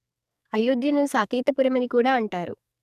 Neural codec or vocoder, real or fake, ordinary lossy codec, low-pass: codec, 44.1 kHz, 2.6 kbps, SNAC; fake; none; 14.4 kHz